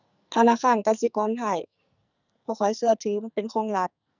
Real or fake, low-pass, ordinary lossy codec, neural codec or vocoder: fake; 7.2 kHz; none; codec, 44.1 kHz, 2.6 kbps, SNAC